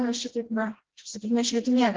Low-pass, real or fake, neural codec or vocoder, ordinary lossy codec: 7.2 kHz; fake; codec, 16 kHz, 1 kbps, FreqCodec, smaller model; Opus, 16 kbps